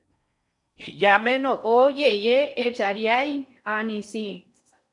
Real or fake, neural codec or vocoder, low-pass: fake; codec, 16 kHz in and 24 kHz out, 0.6 kbps, FocalCodec, streaming, 4096 codes; 10.8 kHz